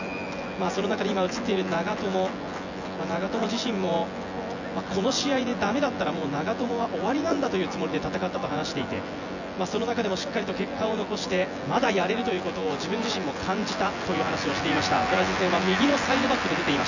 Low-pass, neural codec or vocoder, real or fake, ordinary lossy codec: 7.2 kHz; vocoder, 24 kHz, 100 mel bands, Vocos; fake; none